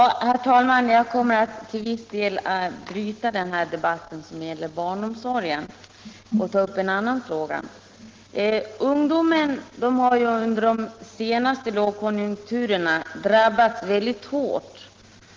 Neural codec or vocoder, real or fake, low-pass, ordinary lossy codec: none; real; 7.2 kHz; Opus, 16 kbps